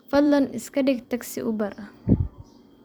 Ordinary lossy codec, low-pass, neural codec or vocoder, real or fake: none; none; vocoder, 44.1 kHz, 128 mel bands every 256 samples, BigVGAN v2; fake